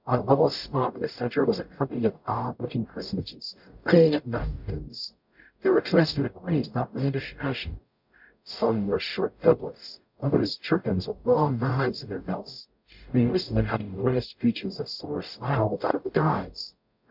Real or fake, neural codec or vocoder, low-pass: fake; codec, 44.1 kHz, 0.9 kbps, DAC; 5.4 kHz